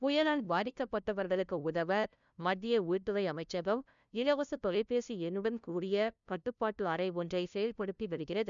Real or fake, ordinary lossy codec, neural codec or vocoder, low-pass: fake; none; codec, 16 kHz, 0.5 kbps, FunCodec, trained on LibriTTS, 25 frames a second; 7.2 kHz